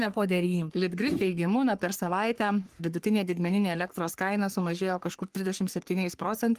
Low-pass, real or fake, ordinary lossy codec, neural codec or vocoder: 14.4 kHz; fake; Opus, 24 kbps; codec, 44.1 kHz, 3.4 kbps, Pupu-Codec